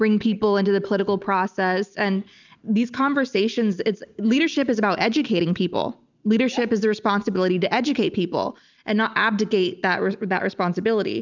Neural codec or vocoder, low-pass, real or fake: none; 7.2 kHz; real